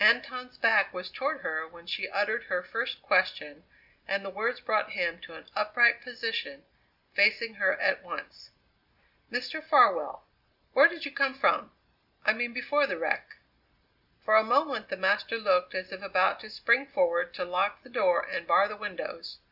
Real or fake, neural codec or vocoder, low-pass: real; none; 5.4 kHz